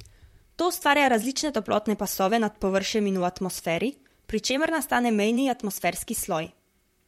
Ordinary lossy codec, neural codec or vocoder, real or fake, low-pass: MP3, 64 kbps; vocoder, 44.1 kHz, 128 mel bands, Pupu-Vocoder; fake; 19.8 kHz